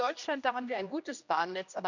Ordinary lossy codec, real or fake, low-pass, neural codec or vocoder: none; fake; 7.2 kHz; codec, 16 kHz, 1 kbps, X-Codec, HuBERT features, trained on general audio